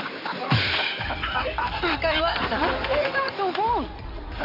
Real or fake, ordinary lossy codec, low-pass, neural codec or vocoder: fake; none; 5.4 kHz; codec, 16 kHz in and 24 kHz out, 2.2 kbps, FireRedTTS-2 codec